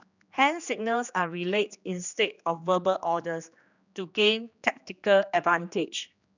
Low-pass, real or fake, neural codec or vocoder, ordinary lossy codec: 7.2 kHz; fake; codec, 16 kHz, 2 kbps, X-Codec, HuBERT features, trained on general audio; none